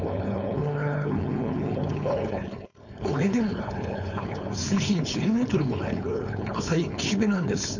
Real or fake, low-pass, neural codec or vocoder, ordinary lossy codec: fake; 7.2 kHz; codec, 16 kHz, 4.8 kbps, FACodec; none